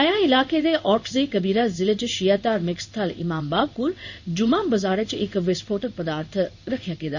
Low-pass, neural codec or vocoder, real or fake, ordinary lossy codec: 7.2 kHz; codec, 16 kHz in and 24 kHz out, 1 kbps, XY-Tokenizer; fake; none